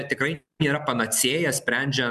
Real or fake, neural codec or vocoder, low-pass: real; none; 14.4 kHz